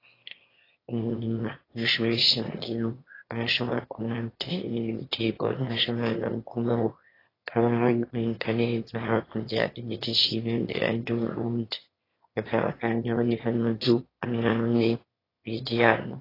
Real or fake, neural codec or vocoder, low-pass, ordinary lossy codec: fake; autoencoder, 22.05 kHz, a latent of 192 numbers a frame, VITS, trained on one speaker; 5.4 kHz; AAC, 24 kbps